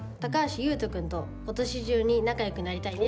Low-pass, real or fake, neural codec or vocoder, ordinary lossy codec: none; real; none; none